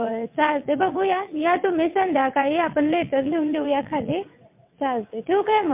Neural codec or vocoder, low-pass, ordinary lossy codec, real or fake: vocoder, 22.05 kHz, 80 mel bands, WaveNeXt; 3.6 kHz; MP3, 32 kbps; fake